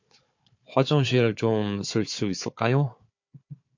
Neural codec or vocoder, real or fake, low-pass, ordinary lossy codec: codec, 16 kHz, 4 kbps, FunCodec, trained on Chinese and English, 50 frames a second; fake; 7.2 kHz; MP3, 48 kbps